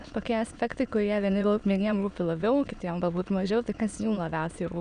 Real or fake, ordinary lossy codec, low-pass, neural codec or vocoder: fake; Opus, 64 kbps; 9.9 kHz; autoencoder, 22.05 kHz, a latent of 192 numbers a frame, VITS, trained on many speakers